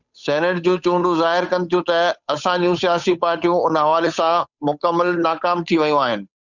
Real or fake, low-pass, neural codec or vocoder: fake; 7.2 kHz; codec, 16 kHz, 8 kbps, FunCodec, trained on Chinese and English, 25 frames a second